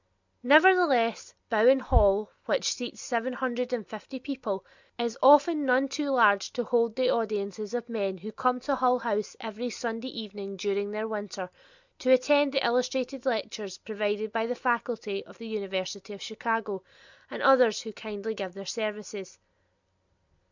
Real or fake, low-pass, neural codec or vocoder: real; 7.2 kHz; none